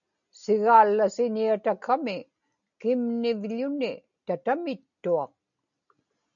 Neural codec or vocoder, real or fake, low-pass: none; real; 7.2 kHz